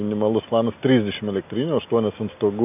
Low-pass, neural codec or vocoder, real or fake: 3.6 kHz; none; real